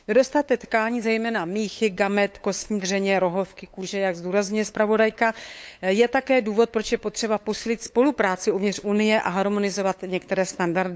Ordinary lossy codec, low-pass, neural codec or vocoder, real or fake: none; none; codec, 16 kHz, 8 kbps, FunCodec, trained on LibriTTS, 25 frames a second; fake